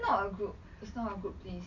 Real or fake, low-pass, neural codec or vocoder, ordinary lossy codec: real; 7.2 kHz; none; none